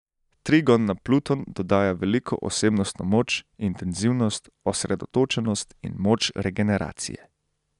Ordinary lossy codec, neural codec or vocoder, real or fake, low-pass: none; none; real; 10.8 kHz